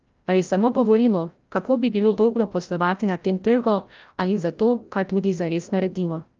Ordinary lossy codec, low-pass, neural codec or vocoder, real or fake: Opus, 24 kbps; 7.2 kHz; codec, 16 kHz, 0.5 kbps, FreqCodec, larger model; fake